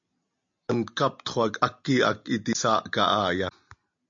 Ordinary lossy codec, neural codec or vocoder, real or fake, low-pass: MP3, 48 kbps; none; real; 7.2 kHz